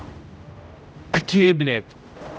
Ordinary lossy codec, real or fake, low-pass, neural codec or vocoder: none; fake; none; codec, 16 kHz, 0.5 kbps, X-Codec, HuBERT features, trained on general audio